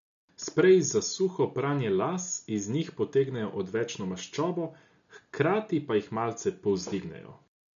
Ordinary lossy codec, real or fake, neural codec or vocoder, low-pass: none; real; none; 7.2 kHz